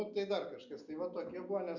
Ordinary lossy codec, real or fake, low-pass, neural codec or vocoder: Opus, 64 kbps; real; 7.2 kHz; none